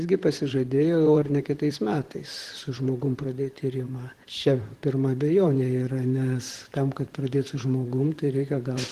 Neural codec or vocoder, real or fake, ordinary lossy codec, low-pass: vocoder, 44.1 kHz, 128 mel bands, Pupu-Vocoder; fake; Opus, 16 kbps; 14.4 kHz